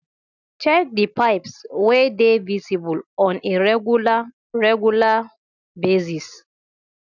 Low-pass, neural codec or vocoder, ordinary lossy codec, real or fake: 7.2 kHz; none; none; real